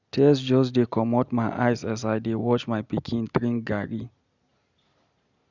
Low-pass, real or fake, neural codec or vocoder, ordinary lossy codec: 7.2 kHz; real; none; none